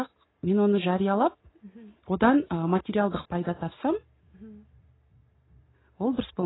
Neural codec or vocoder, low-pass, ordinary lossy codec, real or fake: none; 7.2 kHz; AAC, 16 kbps; real